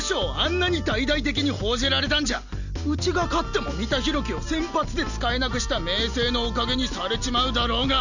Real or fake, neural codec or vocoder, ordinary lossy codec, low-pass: real; none; none; 7.2 kHz